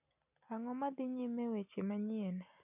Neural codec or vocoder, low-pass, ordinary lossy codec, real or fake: none; 3.6 kHz; none; real